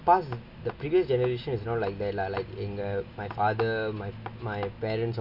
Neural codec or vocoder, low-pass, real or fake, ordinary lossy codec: none; 5.4 kHz; real; none